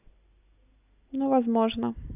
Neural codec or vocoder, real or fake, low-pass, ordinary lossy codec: none; real; 3.6 kHz; none